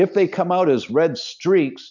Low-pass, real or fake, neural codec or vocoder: 7.2 kHz; real; none